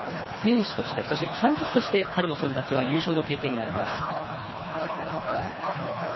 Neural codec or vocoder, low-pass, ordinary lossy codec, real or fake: codec, 24 kHz, 1.5 kbps, HILCodec; 7.2 kHz; MP3, 24 kbps; fake